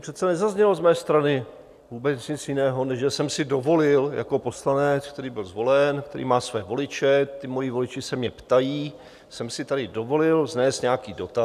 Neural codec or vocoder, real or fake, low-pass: none; real; 14.4 kHz